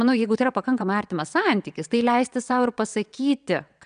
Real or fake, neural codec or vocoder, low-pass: fake; vocoder, 22.05 kHz, 80 mel bands, WaveNeXt; 9.9 kHz